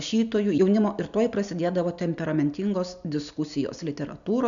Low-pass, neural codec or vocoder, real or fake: 7.2 kHz; none; real